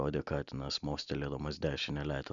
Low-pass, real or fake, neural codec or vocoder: 7.2 kHz; real; none